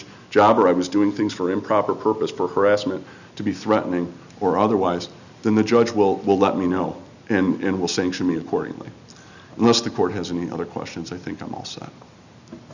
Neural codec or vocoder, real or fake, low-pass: none; real; 7.2 kHz